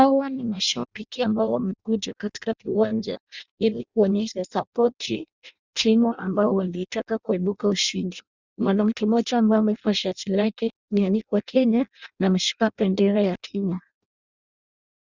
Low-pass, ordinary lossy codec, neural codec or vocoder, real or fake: 7.2 kHz; Opus, 64 kbps; codec, 16 kHz in and 24 kHz out, 0.6 kbps, FireRedTTS-2 codec; fake